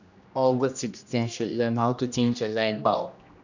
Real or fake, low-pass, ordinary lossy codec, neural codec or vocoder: fake; 7.2 kHz; none; codec, 16 kHz, 1 kbps, X-Codec, HuBERT features, trained on general audio